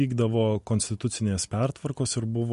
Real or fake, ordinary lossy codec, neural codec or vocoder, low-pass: real; MP3, 48 kbps; none; 14.4 kHz